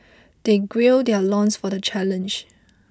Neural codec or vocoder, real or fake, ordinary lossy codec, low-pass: none; real; none; none